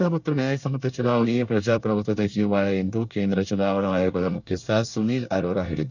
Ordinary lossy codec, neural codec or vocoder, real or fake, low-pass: none; codec, 24 kHz, 1 kbps, SNAC; fake; 7.2 kHz